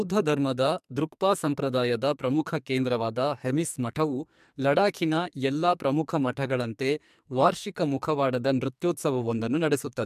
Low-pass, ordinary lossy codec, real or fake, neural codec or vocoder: 14.4 kHz; MP3, 96 kbps; fake; codec, 44.1 kHz, 2.6 kbps, SNAC